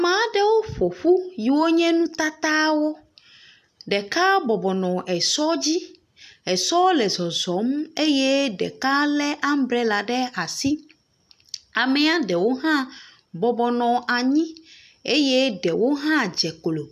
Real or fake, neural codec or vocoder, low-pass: real; none; 14.4 kHz